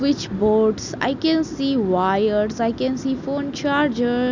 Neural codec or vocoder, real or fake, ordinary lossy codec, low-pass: none; real; none; 7.2 kHz